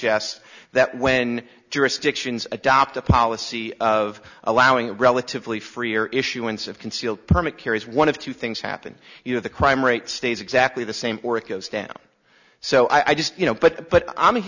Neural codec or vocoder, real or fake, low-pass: none; real; 7.2 kHz